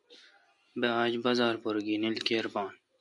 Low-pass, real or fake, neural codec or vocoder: 10.8 kHz; real; none